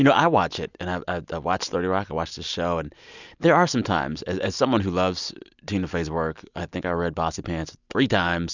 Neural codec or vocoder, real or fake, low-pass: none; real; 7.2 kHz